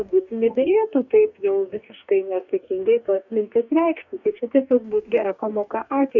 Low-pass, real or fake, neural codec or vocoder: 7.2 kHz; fake; codec, 44.1 kHz, 2.6 kbps, DAC